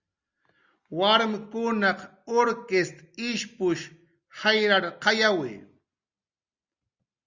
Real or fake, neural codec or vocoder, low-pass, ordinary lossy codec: real; none; 7.2 kHz; Opus, 64 kbps